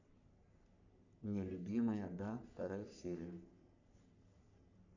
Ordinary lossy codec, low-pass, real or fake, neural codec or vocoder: none; 7.2 kHz; fake; codec, 44.1 kHz, 3.4 kbps, Pupu-Codec